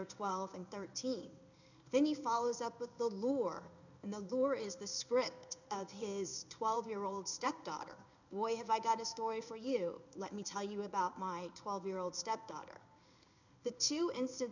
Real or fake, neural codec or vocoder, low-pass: fake; codec, 16 kHz in and 24 kHz out, 1 kbps, XY-Tokenizer; 7.2 kHz